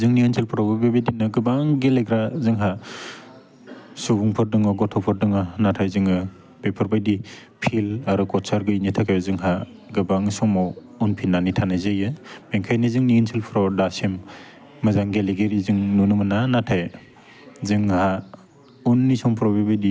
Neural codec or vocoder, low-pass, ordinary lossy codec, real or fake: none; none; none; real